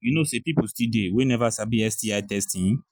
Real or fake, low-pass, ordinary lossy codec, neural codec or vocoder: real; none; none; none